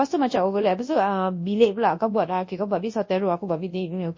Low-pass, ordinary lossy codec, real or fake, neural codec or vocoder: 7.2 kHz; MP3, 32 kbps; fake; codec, 24 kHz, 0.9 kbps, WavTokenizer, large speech release